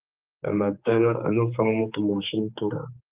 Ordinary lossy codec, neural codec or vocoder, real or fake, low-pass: Opus, 32 kbps; codec, 16 kHz, 4 kbps, X-Codec, HuBERT features, trained on general audio; fake; 3.6 kHz